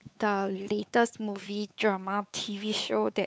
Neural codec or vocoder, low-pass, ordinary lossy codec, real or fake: codec, 16 kHz, 4 kbps, X-Codec, WavLM features, trained on Multilingual LibriSpeech; none; none; fake